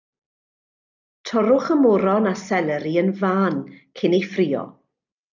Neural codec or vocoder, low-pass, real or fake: none; 7.2 kHz; real